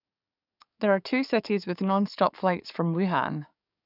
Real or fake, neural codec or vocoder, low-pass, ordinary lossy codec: fake; codec, 44.1 kHz, 7.8 kbps, DAC; 5.4 kHz; none